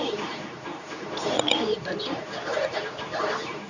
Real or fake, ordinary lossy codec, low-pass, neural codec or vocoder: fake; none; 7.2 kHz; codec, 24 kHz, 0.9 kbps, WavTokenizer, medium speech release version 2